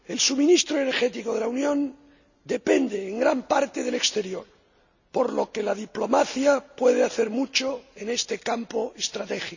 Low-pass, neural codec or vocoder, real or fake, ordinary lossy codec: 7.2 kHz; none; real; none